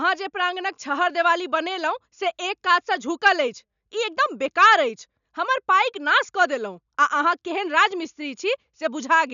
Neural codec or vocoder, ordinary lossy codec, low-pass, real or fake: none; none; 7.2 kHz; real